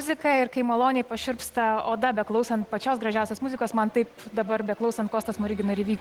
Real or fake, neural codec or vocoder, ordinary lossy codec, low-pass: real; none; Opus, 16 kbps; 19.8 kHz